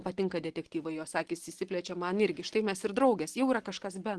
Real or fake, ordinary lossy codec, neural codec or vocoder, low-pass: real; Opus, 16 kbps; none; 10.8 kHz